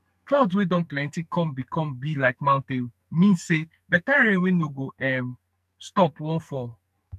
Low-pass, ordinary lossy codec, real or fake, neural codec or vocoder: 14.4 kHz; none; fake; codec, 44.1 kHz, 2.6 kbps, SNAC